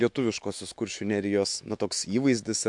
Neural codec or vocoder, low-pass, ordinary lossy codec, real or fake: autoencoder, 48 kHz, 128 numbers a frame, DAC-VAE, trained on Japanese speech; 10.8 kHz; MP3, 64 kbps; fake